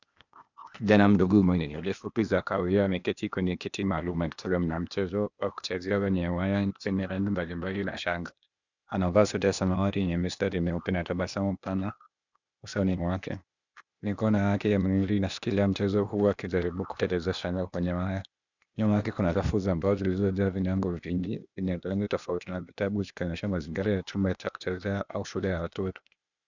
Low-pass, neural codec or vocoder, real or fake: 7.2 kHz; codec, 16 kHz, 0.8 kbps, ZipCodec; fake